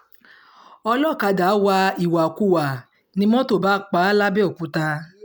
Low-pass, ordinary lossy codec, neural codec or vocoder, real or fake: none; none; none; real